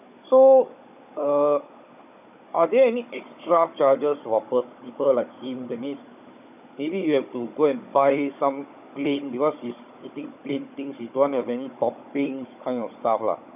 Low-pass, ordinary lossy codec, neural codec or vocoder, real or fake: 3.6 kHz; none; codec, 16 kHz, 16 kbps, FunCodec, trained on Chinese and English, 50 frames a second; fake